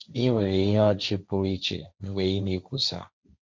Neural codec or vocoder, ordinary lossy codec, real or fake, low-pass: codec, 16 kHz, 1.1 kbps, Voila-Tokenizer; none; fake; none